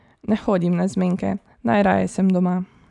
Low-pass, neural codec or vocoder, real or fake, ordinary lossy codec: 10.8 kHz; none; real; none